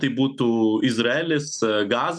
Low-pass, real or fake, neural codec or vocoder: 9.9 kHz; real; none